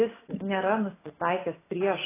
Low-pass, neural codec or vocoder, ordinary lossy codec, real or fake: 3.6 kHz; none; AAC, 16 kbps; real